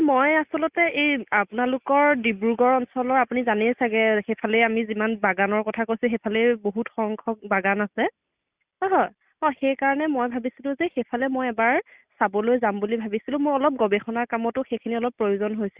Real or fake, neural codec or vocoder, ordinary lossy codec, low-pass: real; none; Opus, 64 kbps; 3.6 kHz